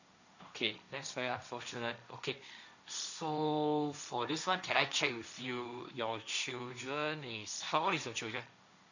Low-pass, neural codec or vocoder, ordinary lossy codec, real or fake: 7.2 kHz; codec, 16 kHz, 1.1 kbps, Voila-Tokenizer; none; fake